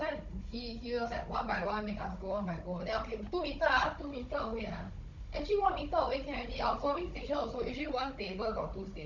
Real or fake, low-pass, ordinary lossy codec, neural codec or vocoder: fake; 7.2 kHz; Opus, 64 kbps; codec, 16 kHz, 16 kbps, FunCodec, trained on Chinese and English, 50 frames a second